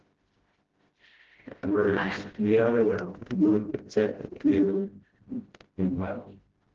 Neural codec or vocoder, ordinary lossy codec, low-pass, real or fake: codec, 16 kHz, 0.5 kbps, FreqCodec, smaller model; Opus, 16 kbps; 7.2 kHz; fake